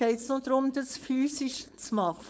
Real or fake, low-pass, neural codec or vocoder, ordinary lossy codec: fake; none; codec, 16 kHz, 4.8 kbps, FACodec; none